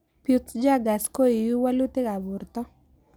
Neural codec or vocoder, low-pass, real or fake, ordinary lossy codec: none; none; real; none